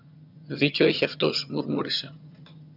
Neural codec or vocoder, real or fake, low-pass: vocoder, 22.05 kHz, 80 mel bands, HiFi-GAN; fake; 5.4 kHz